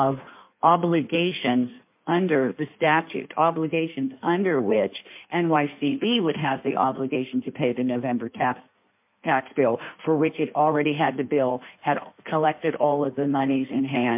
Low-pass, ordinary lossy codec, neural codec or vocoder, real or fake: 3.6 kHz; MP3, 32 kbps; codec, 16 kHz in and 24 kHz out, 1.1 kbps, FireRedTTS-2 codec; fake